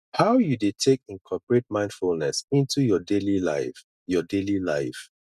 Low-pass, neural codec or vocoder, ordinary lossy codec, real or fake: 14.4 kHz; none; none; real